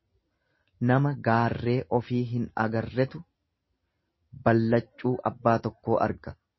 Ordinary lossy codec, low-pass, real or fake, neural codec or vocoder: MP3, 24 kbps; 7.2 kHz; real; none